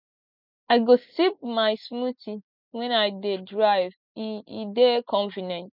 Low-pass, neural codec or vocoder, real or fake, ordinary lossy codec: 5.4 kHz; none; real; none